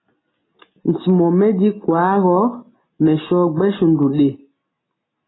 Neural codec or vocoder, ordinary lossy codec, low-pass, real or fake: none; AAC, 16 kbps; 7.2 kHz; real